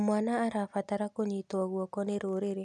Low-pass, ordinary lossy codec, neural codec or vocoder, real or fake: none; none; none; real